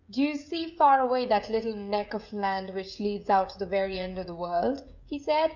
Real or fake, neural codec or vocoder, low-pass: fake; codec, 16 kHz, 16 kbps, FreqCodec, smaller model; 7.2 kHz